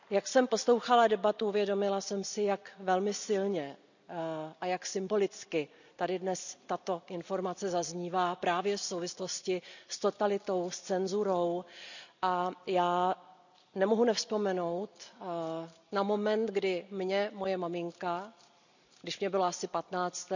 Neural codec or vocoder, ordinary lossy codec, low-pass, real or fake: none; none; 7.2 kHz; real